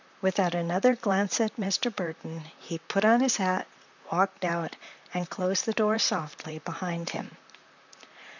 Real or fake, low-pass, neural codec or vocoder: fake; 7.2 kHz; vocoder, 44.1 kHz, 128 mel bands, Pupu-Vocoder